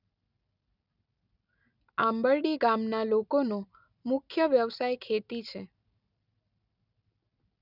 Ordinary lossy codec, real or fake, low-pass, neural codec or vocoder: none; real; 5.4 kHz; none